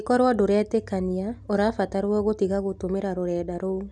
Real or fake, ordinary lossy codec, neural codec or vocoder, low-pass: real; none; none; none